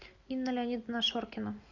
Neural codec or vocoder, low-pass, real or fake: none; 7.2 kHz; real